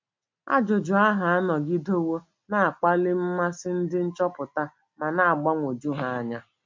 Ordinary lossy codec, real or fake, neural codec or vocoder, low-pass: MP3, 64 kbps; real; none; 7.2 kHz